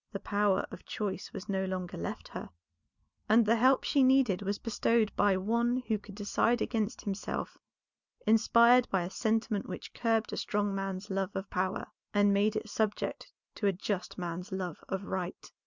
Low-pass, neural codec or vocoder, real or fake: 7.2 kHz; none; real